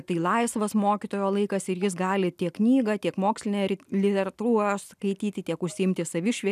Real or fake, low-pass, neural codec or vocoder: real; 14.4 kHz; none